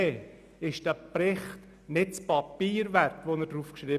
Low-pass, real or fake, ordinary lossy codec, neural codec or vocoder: 14.4 kHz; real; none; none